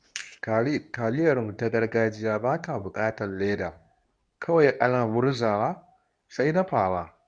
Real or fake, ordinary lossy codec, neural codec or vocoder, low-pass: fake; none; codec, 24 kHz, 0.9 kbps, WavTokenizer, medium speech release version 2; 9.9 kHz